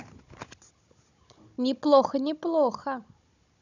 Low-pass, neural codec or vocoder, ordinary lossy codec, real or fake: 7.2 kHz; codec, 16 kHz, 16 kbps, FunCodec, trained on Chinese and English, 50 frames a second; none; fake